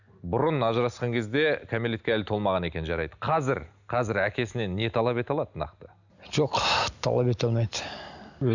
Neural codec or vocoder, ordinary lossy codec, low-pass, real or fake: none; none; 7.2 kHz; real